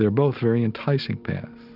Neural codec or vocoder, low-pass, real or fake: none; 5.4 kHz; real